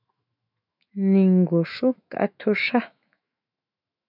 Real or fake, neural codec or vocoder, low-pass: fake; autoencoder, 48 kHz, 128 numbers a frame, DAC-VAE, trained on Japanese speech; 5.4 kHz